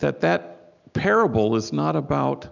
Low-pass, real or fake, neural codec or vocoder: 7.2 kHz; real; none